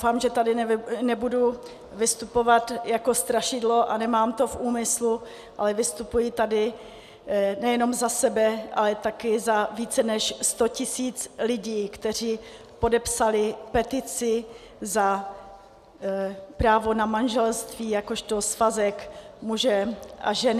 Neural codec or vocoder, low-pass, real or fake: none; 14.4 kHz; real